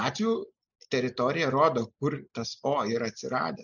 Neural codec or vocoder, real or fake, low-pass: none; real; 7.2 kHz